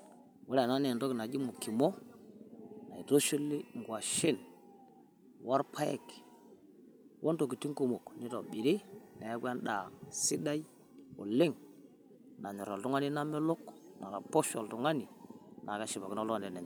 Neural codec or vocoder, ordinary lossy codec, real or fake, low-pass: none; none; real; none